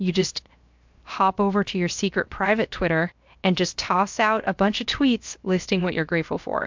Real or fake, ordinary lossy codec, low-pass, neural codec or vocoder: fake; MP3, 64 kbps; 7.2 kHz; codec, 16 kHz, 0.7 kbps, FocalCodec